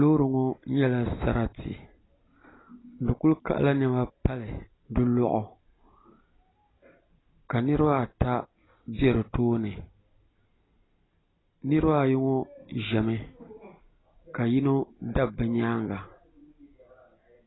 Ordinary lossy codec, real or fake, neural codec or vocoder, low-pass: AAC, 16 kbps; real; none; 7.2 kHz